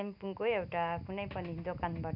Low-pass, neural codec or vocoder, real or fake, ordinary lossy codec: 5.4 kHz; none; real; none